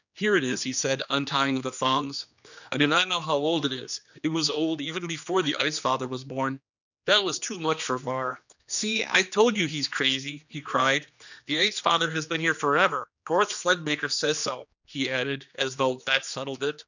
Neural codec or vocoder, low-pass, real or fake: codec, 16 kHz, 2 kbps, X-Codec, HuBERT features, trained on general audio; 7.2 kHz; fake